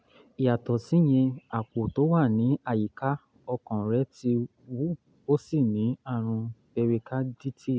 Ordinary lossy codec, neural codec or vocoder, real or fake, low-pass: none; none; real; none